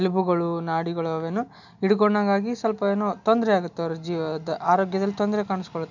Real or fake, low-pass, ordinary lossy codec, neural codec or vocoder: real; 7.2 kHz; none; none